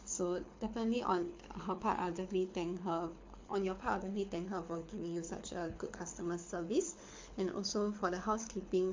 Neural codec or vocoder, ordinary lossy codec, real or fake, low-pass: codec, 24 kHz, 6 kbps, HILCodec; MP3, 48 kbps; fake; 7.2 kHz